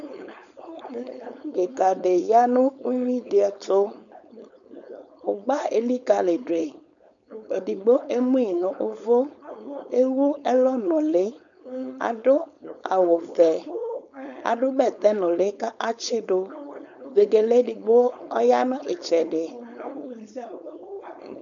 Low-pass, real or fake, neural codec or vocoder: 7.2 kHz; fake; codec, 16 kHz, 4.8 kbps, FACodec